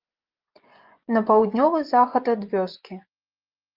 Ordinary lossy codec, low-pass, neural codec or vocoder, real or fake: Opus, 24 kbps; 5.4 kHz; vocoder, 24 kHz, 100 mel bands, Vocos; fake